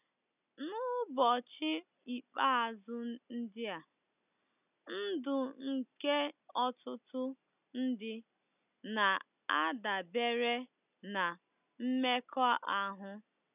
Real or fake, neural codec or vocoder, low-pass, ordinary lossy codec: real; none; 3.6 kHz; none